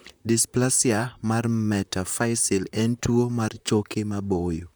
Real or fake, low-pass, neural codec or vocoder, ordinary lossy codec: fake; none; vocoder, 44.1 kHz, 128 mel bands, Pupu-Vocoder; none